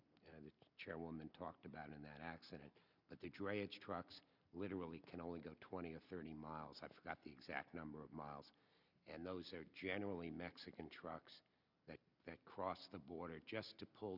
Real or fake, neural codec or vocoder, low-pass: real; none; 5.4 kHz